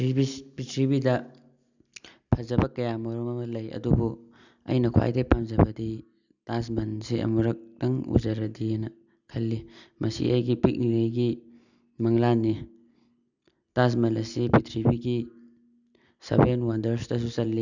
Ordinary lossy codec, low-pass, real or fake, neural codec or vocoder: none; 7.2 kHz; real; none